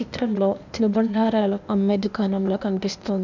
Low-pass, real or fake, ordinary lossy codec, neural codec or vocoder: 7.2 kHz; fake; none; codec, 16 kHz, 0.8 kbps, ZipCodec